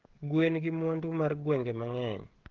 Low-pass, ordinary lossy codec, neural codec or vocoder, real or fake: 7.2 kHz; Opus, 16 kbps; codec, 16 kHz, 16 kbps, FreqCodec, smaller model; fake